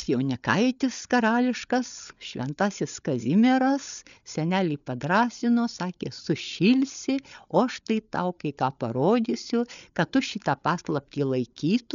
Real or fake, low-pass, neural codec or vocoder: fake; 7.2 kHz; codec, 16 kHz, 8 kbps, FreqCodec, larger model